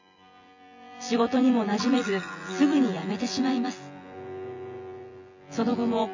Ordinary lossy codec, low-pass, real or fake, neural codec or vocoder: AAC, 48 kbps; 7.2 kHz; fake; vocoder, 24 kHz, 100 mel bands, Vocos